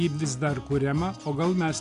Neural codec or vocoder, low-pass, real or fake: none; 10.8 kHz; real